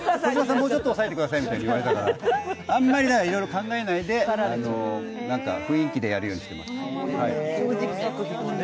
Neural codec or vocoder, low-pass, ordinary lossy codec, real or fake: none; none; none; real